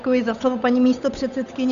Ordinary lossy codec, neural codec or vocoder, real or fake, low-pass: AAC, 96 kbps; codec, 16 kHz, 8 kbps, FunCodec, trained on Chinese and English, 25 frames a second; fake; 7.2 kHz